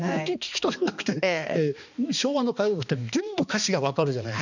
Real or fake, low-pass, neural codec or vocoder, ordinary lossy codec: fake; 7.2 kHz; codec, 16 kHz, 2 kbps, X-Codec, HuBERT features, trained on balanced general audio; none